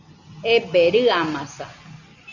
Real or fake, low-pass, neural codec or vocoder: real; 7.2 kHz; none